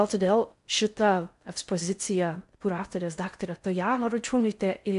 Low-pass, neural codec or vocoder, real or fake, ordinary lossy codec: 10.8 kHz; codec, 16 kHz in and 24 kHz out, 0.6 kbps, FocalCodec, streaming, 4096 codes; fake; MP3, 64 kbps